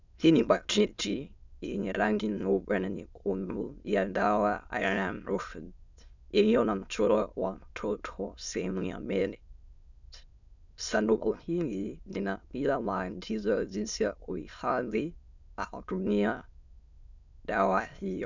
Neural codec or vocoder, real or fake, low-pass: autoencoder, 22.05 kHz, a latent of 192 numbers a frame, VITS, trained on many speakers; fake; 7.2 kHz